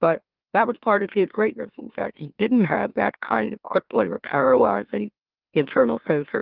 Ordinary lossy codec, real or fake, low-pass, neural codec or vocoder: Opus, 32 kbps; fake; 5.4 kHz; autoencoder, 44.1 kHz, a latent of 192 numbers a frame, MeloTTS